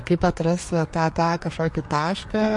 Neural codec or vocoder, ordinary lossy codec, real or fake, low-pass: codec, 24 kHz, 1 kbps, SNAC; MP3, 48 kbps; fake; 10.8 kHz